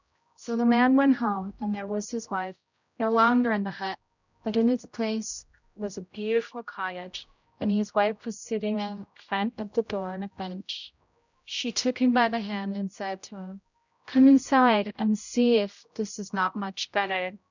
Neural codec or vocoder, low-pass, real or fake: codec, 16 kHz, 0.5 kbps, X-Codec, HuBERT features, trained on general audio; 7.2 kHz; fake